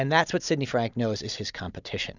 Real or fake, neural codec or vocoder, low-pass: real; none; 7.2 kHz